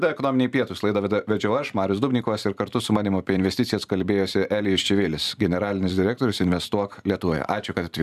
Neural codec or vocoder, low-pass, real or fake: vocoder, 44.1 kHz, 128 mel bands every 512 samples, BigVGAN v2; 14.4 kHz; fake